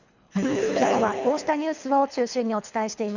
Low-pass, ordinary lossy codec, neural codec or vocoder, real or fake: 7.2 kHz; none; codec, 24 kHz, 3 kbps, HILCodec; fake